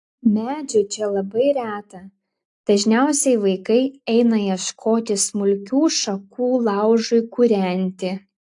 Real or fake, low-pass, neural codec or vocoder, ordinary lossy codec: real; 10.8 kHz; none; AAC, 64 kbps